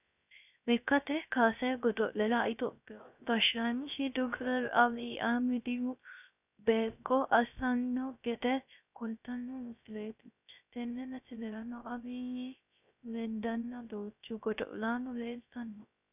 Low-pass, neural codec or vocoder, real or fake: 3.6 kHz; codec, 16 kHz, 0.3 kbps, FocalCodec; fake